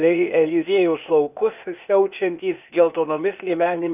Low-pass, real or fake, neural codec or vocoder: 3.6 kHz; fake; codec, 16 kHz, 0.8 kbps, ZipCodec